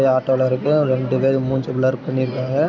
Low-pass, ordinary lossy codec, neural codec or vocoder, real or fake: 7.2 kHz; none; none; real